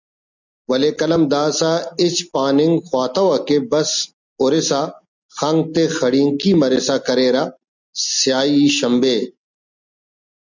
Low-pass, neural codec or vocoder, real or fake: 7.2 kHz; none; real